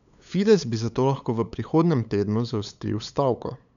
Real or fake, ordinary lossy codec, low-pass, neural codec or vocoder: fake; none; 7.2 kHz; codec, 16 kHz, 8 kbps, FunCodec, trained on LibriTTS, 25 frames a second